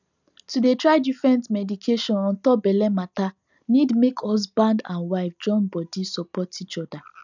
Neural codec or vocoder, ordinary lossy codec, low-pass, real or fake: none; none; 7.2 kHz; real